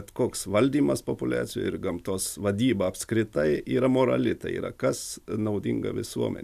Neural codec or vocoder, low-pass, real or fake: none; 14.4 kHz; real